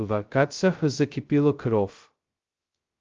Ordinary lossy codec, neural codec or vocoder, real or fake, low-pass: Opus, 32 kbps; codec, 16 kHz, 0.2 kbps, FocalCodec; fake; 7.2 kHz